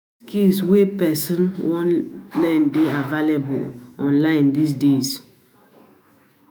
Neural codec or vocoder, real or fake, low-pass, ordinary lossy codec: autoencoder, 48 kHz, 128 numbers a frame, DAC-VAE, trained on Japanese speech; fake; none; none